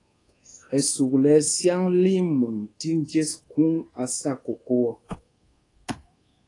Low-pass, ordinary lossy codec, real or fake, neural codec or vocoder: 10.8 kHz; AAC, 32 kbps; fake; codec, 24 kHz, 1.2 kbps, DualCodec